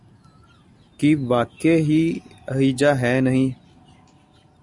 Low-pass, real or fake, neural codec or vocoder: 10.8 kHz; real; none